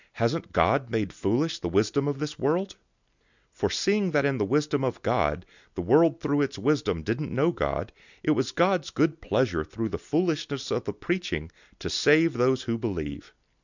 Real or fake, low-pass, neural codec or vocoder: real; 7.2 kHz; none